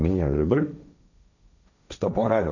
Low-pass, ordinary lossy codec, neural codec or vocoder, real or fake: 7.2 kHz; none; codec, 16 kHz, 1.1 kbps, Voila-Tokenizer; fake